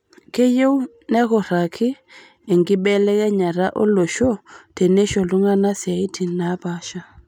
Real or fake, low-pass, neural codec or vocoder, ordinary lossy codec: real; 19.8 kHz; none; none